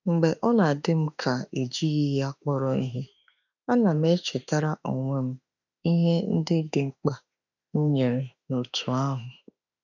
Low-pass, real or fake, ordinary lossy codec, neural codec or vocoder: 7.2 kHz; fake; none; autoencoder, 48 kHz, 32 numbers a frame, DAC-VAE, trained on Japanese speech